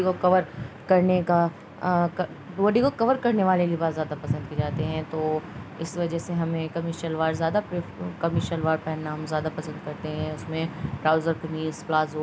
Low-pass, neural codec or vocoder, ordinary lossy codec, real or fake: none; none; none; real